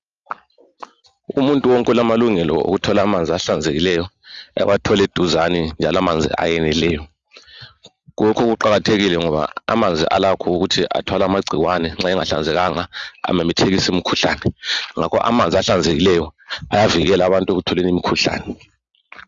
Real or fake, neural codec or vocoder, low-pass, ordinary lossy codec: real; none; 7.2 kHz; Opus, 32 kbps